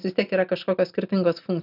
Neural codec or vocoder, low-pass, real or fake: none; 5.4 kHz; real